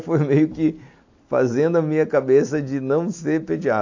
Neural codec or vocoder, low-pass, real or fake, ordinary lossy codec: none; 7.2 kHz; real; AAC, 48 kbps